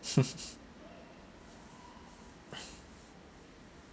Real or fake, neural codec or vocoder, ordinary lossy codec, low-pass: real; none; none; none